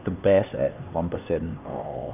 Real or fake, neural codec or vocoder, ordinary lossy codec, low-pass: fake; codec, 16 kHz, 2 kbps, X-Codec, HuBERT features, trained on LibriSpeech; none; 3.6 kHz